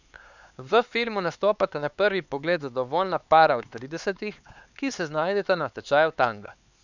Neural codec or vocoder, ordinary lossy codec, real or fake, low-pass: codec, 16 kHz, 4 kbps, X-Codec, HuBERT features, trained on LibriSpeech; none; fake; 7.2 kHz